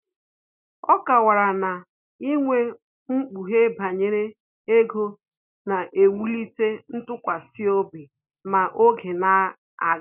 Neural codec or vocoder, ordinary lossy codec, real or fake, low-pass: none; none; real; 3.6 kHz